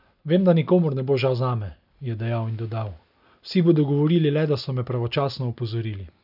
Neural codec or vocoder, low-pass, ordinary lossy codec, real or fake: none; 5.4 kHz; none; real